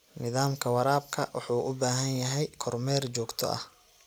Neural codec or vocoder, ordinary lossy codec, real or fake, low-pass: none; none; real; none